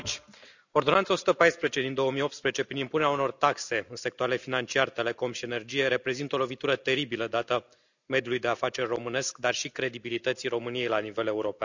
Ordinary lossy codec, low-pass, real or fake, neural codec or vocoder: none; 7.2 kHz; real; none